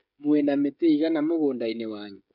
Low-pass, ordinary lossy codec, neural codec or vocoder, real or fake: 5.4 kHz; MP3, 48 kbps; codec, 16 kHz, 16 kbps, FreqCodec, smaller model; fake